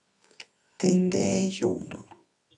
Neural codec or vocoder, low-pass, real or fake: codec, 24 kHz, 0.9 kbps, WavTokenizer, medium music audio release; 10.8 kHz; fake